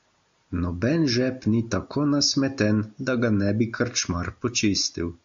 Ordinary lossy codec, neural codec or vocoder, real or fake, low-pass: AAC, 64 kbps; none; real; 7.2 kHz